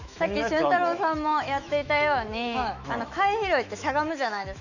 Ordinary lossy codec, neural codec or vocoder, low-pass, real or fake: none; autoencoder, 48 kHz, 128 numbers a frame, DAC-VAE, trained on Japanese speech; 7.2 kHz; fake